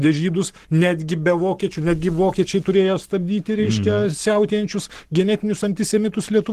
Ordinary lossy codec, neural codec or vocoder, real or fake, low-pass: Opus, 16 kbps; codec, 44.1 kHz, 7.8 kbps, Pupu-Codec; fake; 14.4 kHz